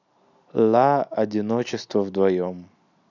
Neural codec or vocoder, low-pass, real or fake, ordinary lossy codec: none; 7.2 kHz; real; none